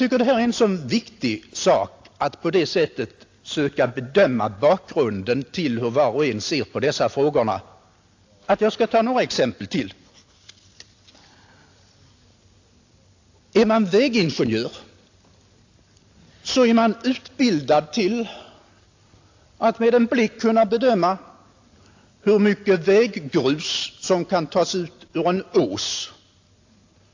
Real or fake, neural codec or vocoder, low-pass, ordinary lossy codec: real; none; 7.2 kHz; AAC, 48 kbps